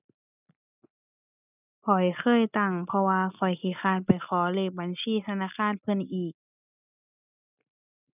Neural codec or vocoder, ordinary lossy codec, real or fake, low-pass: none; none; real; 3.6 kHz